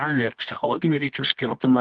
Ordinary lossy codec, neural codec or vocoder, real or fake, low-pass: Opus, 24 kbps; codec, 24 kHz, 0.9 kbps, WavTokenizer, medium music audio release; fake; 9.9 kHz